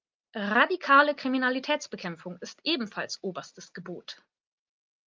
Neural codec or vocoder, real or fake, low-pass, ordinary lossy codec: none; real; 7.2 kHz; Opus, 24 kbps